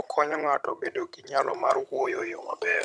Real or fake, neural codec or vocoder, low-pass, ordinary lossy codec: fake; vocoder, 22.05 kHz, 80 mel bands, HiFi-GAN; none; none